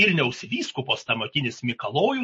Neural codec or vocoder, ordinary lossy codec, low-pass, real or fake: none; MP3, 32 kbps; 9.9 kHz; real